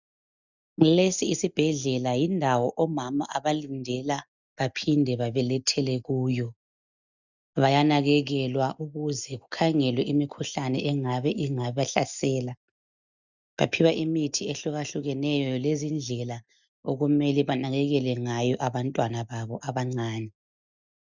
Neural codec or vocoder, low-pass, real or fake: none; 7.2 kHz; real